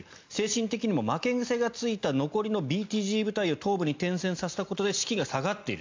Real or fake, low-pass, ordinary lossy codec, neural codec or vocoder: real; 7.2 kHz; MP3, 64 kbps; none